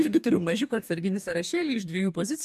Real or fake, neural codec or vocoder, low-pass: fake; codec, 44.1 kHz, 2.6 kbps, DAC; 14.4 kHz